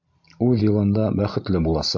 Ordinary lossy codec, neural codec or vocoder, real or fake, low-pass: MP3, 64 kbps; none; real; 7.2 kHz